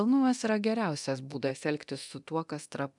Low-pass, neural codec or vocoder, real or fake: 10.8 kHz; codec, 24 kHz, 0.9 kbps, DualCodec; fake